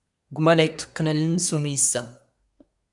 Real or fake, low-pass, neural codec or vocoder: fake; 10.8 kHz; codec, 24 kHz, 1 kbps, SNAC